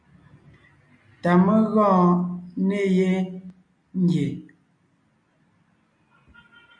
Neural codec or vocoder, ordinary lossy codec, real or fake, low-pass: none; MP3, 64 kbps; real; 9.9 kHz